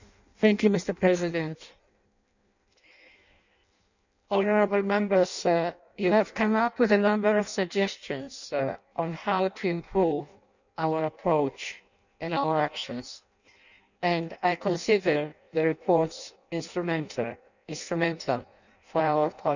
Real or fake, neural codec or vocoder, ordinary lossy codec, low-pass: fake; codec, 16 kHz in and 24 kHz out, 0.6 kbps, FireRedTTS-2 codec; none; 7.2 kHz